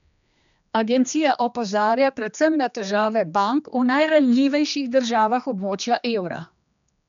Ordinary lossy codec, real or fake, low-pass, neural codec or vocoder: MP3, 96 kbps; fake; 7.2 kHz; codec, 16 kHz, 2 kbps, X-Codec, HuBERT features, trained on general audio